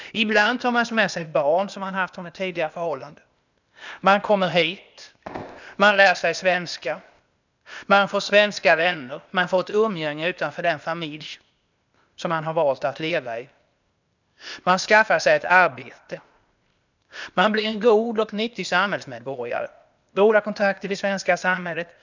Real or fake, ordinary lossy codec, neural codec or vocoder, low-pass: fake; none; codec, 16 kHz, 0.8 kbps, ZipCodec; 7.2 kHz